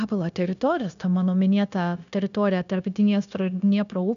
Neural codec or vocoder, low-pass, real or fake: codec, 16 kHz, 0.9 kbps, LongCat-Audio-Codec; 7.2 kHz; fake